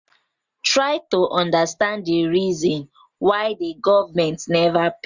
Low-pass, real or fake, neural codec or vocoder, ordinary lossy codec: 7.2 kHz; real; none; Opus, 64 kbps